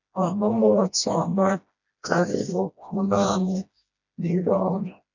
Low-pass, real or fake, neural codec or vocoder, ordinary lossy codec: 7.2 kHz; fake; codec, 16 kHz, 1 kbps, FreqCodec, smaller model; none